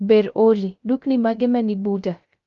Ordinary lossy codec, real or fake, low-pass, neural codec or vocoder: Opus, 32 kbps; fake; 7.2 kHz; codec, 16 kHz, 0.3 kbps, FocalCodec